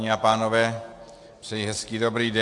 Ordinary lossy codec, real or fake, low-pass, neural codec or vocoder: AAC, 64 kbps; real; 10.8 kHz; none